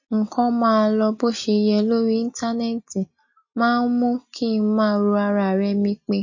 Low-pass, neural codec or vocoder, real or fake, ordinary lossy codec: 7.2 kHz; none; real; MP3, 32 kbps